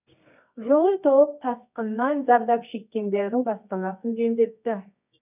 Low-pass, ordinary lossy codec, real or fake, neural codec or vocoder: 3.6 kHz; none; fake; codec, 24 kHz, 0.9 kbps, WavTokenizer, medium music audio release